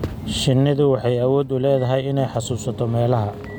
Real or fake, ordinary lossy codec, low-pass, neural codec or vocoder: real; none; none; none